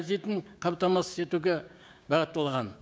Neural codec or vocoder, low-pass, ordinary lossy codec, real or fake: codec, 16 kHz, 6 kbps, DAC; none; none; fake